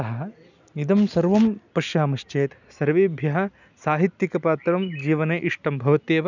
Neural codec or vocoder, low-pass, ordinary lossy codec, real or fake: none; 7.2 kHz; none; real